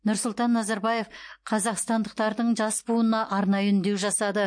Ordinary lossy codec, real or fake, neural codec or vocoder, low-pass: MP3, 48 kbps; real; none; 9.9 kHz